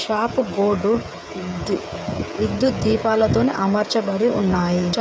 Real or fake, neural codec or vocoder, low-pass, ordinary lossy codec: fake; codec, 16 kHz, 16 kbps, FreqCodec, smaller model; none; none